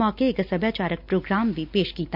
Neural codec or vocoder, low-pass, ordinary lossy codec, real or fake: none; 5.4 kHz; none; real